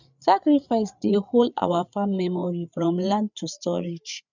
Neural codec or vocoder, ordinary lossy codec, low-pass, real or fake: codec, 16 kHz, 8 kbps, FreqCodec, larger model; none; 7.2 kHz; fake